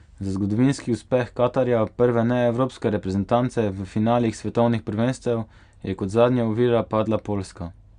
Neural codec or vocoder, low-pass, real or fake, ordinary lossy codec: none; 9.9 kHz; real; Opus, 64 kbps